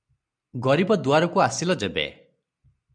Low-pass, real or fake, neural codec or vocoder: 9.9 kHz; real; none